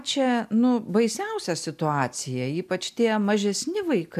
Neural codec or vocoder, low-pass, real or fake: none; 14.4 kHz; real